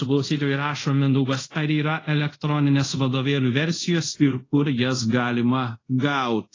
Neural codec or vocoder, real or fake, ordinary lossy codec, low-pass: codec, 24 kHz, 0.5 kbps, DualCodec; fake; AAC, 32 kbps; 7.2 kHz